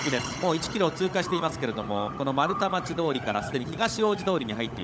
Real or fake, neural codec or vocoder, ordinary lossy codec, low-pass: fake; codec, 16 kHz, 16 kbps, FunCodec, trained on LibriTTS, 50 frames a second; none; none